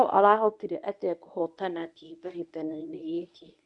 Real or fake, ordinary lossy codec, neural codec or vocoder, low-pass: fake; none; codec, 24 kHz, 0.9 kbps, WavTokenizer, medium speech release version 1; 10.8 kHz